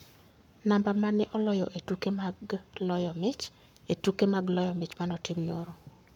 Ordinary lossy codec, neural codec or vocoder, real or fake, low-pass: none; codec, 44.1 kHz, 7.8 kbps, Pupu-Codec; fake; 19.8 kHz